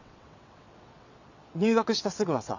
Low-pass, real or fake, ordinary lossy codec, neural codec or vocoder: 7.2 kHz; fake; none; vocoder, 22.05 kHz, 80 mel bands, WaveNeXt